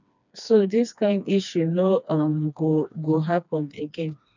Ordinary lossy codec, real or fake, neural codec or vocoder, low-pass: none; fake; codec, 16 kHz, 2 kbps, FreqCodec, smaller model; 7.2 kHz